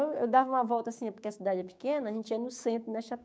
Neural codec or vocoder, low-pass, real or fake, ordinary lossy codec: codec, 16 kHz, 6 kbps, DAC; none; fake; none